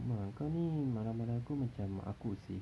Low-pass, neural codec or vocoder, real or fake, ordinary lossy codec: none; none; real; none